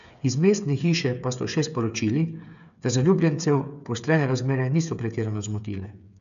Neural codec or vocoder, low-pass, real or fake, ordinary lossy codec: codec, 16 kHz, 8 kbps, FreqCodec, smaller model; 7.2 kHz; fake; none